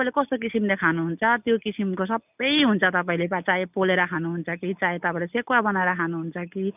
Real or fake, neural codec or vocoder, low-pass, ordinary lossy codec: real; none; 3.6 kHz; none